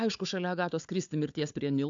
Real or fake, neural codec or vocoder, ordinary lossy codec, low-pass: fake; codec, 16 kHz, 4 kbps, FunCodec, trained on Chinese and English, 50 frames a second; AAC, 64 kbps; 7.2 kHz